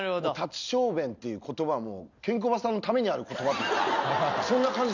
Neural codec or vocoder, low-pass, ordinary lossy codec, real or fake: none; 7.2 kHz; none; real